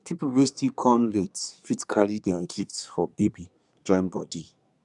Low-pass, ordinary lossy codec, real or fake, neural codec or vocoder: 10.8 kHz; none; fake; codec, 24 kHz, 1 kbps, SNAC